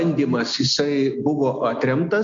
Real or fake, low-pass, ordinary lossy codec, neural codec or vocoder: real; 7.2 kHz; MP3, 48 kbps; none